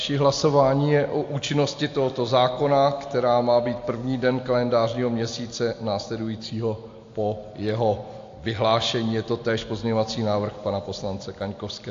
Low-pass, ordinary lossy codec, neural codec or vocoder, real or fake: 7.2 kHz; AAC, 48 kbps; none; real